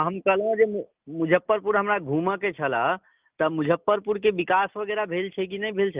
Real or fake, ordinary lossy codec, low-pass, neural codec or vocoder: real; Opus, 32 kbps; 3.6 kHz; none